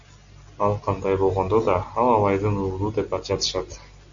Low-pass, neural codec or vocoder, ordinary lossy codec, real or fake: 7.2 kHz; none; AAC, 64 kbps; real